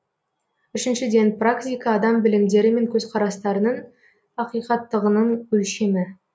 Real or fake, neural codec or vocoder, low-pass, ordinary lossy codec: real; none; none; none